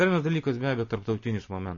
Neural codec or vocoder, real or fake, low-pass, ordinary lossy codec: none; real; 7.2 kHz; MP3, 32 kbps